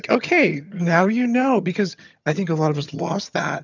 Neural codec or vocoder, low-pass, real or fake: vocoder, 22.05 kHz, 80 mel bands, HiFi-GAN; 7.2 kHz; fake